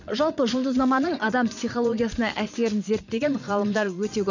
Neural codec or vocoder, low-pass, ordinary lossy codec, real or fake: vocoder, 22.05 kHz, 80 mel bands, Vocos; 7.2 kHz; none; fake